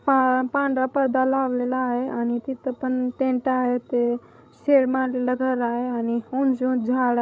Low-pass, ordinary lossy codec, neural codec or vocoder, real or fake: none; none; codec, 16 kHz, 16 kbps, FreqCodec, larger model; fake